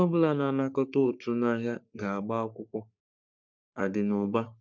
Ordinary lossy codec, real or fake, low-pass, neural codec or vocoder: none; fake; 7.2 kHz; codec, 44.1 kHz, 3.4 kbps, Pupu-Codec